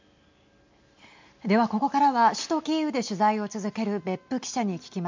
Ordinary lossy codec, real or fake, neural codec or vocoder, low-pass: MP3, 64 kbps; real; none; 7.2 kHz